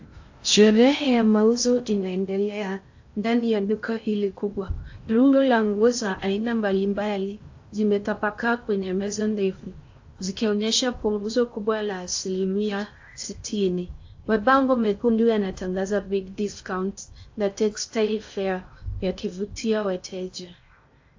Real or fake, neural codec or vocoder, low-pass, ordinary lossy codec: fake; codec, 16 kHz in and 24 kHz out, 0.6 kbps, FocalCodec, streaming, 2048 codes; 7.2 kHz; AAC, 48 kbps